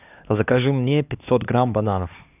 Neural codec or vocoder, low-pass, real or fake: codec, 16 kHz, 2 kbps, X-Codec, HuBERT features, trained on LibriSpeech; 3.6 kHz; fake